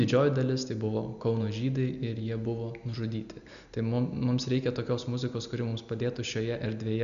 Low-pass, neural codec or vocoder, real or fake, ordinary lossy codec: 7.2 kHz; none; real; MP3, 96 kbps